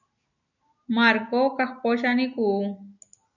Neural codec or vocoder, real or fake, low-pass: none; real; 7.2 kHz